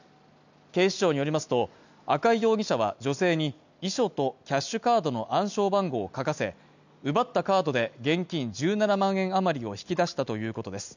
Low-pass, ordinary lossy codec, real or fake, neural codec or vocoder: 7.2 kHz; none; real; none